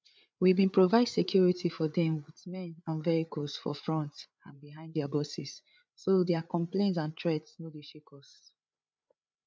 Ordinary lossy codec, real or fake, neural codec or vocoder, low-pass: none; fake; codec, 16 kHz, 8 kbps, FreqCodec, larger model; none